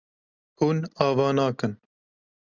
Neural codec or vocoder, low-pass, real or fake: none; 7.2 kHz; real